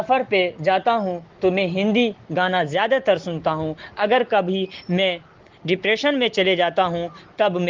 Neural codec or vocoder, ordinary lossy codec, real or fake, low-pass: none; Opus, 16 kbps; real; 7.2 kHz